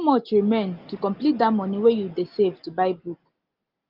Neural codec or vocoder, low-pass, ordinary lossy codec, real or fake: none; 5.4 kHz; Opus, 32 kbps; real